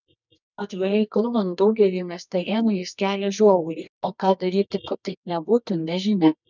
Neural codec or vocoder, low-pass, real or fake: codec, 24 kHz, 0.9 kbps, WavTokenizer, medium music audio release; 7.2 kHz; fake